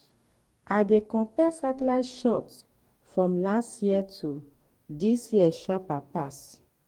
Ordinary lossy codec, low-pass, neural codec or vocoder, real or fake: Opus, 32 kbps; 19.8 kHz; codec, 44.1 kHz, 2.6 kbps, DAC; fake